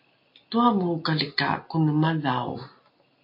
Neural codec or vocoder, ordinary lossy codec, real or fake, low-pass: none; MP3, 32 kbps; real; 5.4 kHz